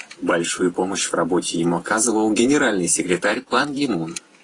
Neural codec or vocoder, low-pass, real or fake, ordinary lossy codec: vocoder, 48 kHz, 128 mel bands, Vocos; 10.8 kHz; fake; AAC, 32 kbps